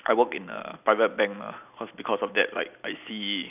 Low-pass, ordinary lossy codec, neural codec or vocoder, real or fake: 3.6 kHz; none; none; real